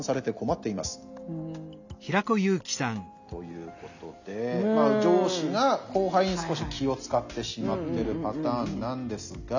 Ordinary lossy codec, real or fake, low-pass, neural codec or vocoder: none; real; 7.2 kHz; none